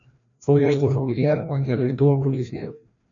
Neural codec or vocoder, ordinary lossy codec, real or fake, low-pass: codec, 16 kHz, 1 kbps, FreqCodec, larger model; MP3, 96 kbps; fake; 7.2 kHz